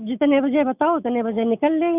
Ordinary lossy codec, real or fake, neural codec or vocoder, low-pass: none; real; none; 3.6 kHz